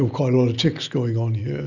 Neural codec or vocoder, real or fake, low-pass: none; real; 7.2 kHz